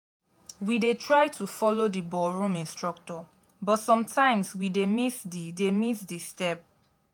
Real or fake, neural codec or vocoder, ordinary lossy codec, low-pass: fake; vocoder, 48 kHz, 128 mel bands, Vocos; none; none